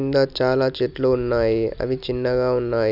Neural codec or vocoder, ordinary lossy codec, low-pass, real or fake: none; none; 5.4 kHz; real